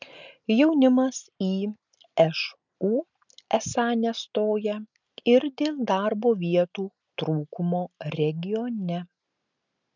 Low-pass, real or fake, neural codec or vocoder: 7.2 kHz; real; none